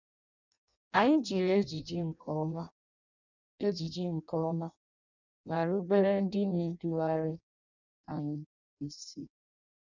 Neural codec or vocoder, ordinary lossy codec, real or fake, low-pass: codec, 16 kHz in and 24 kHz out, 0.6 kbps, FireRedTTS-2 codec; none; fake; 7.2 kHz